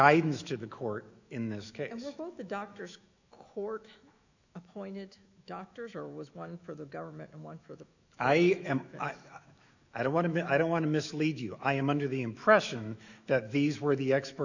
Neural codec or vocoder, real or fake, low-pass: autoencoder, 48 kHz, 128 numbers a frame, DAC-VAE, trained on Japanese speech; fake; 7.2 kHz